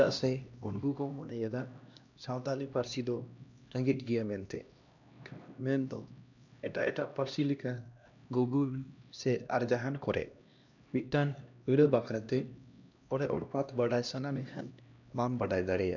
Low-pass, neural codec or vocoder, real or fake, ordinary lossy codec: 7.2 kHz; codec, 16 kHz, 1 kbps, X-Codec, HuBERT features, trained on LibriSpeech; fake; none